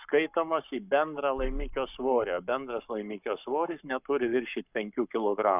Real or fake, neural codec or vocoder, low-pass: fake; codec, 44.1 kHz, 7.8 kbps, Pupu-Codec; 3.6 kHz